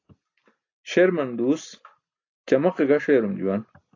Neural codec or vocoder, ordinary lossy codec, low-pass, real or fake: none; AAC, 48 kbps; 7.2 kHz; real